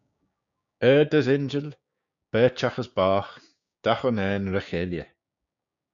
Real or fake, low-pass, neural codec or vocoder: fake; 7.2 kHz; codec, 16 kHz, 6 kbps, DAC